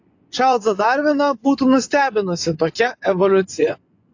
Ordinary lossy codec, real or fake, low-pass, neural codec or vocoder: AAC, 48 kbps; fake; 7.2 kHz; vocoder, 24 kHz, 100 mel bands, Vocos